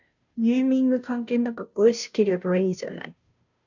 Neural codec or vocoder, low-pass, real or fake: codec, 16 kHz, 0.5 kbps, FunCodec, trained on Chinese and English, 25 frames a second; 7.2 kHz; fake